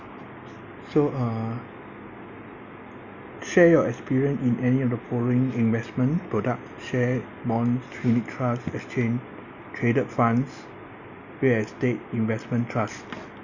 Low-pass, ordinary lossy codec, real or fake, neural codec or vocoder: 7.2 kHz; none; real; none